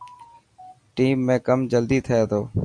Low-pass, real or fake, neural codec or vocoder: 9.9 kHz; real; none